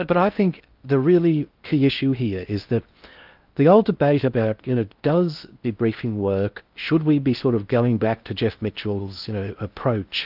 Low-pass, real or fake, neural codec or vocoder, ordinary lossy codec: 5.4 kHz; fake; codec, 16 kHz in and 24 kHz out, 0.6 kbps, FocalCodec, streaming, 2048 codes; Opus, 24 kbps